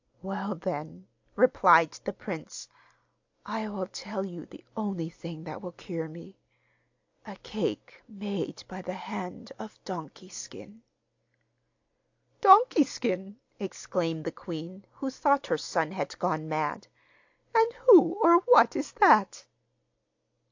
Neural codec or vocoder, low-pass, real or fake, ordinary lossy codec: none; 7.2 kHz; real; AAC, 48 kbps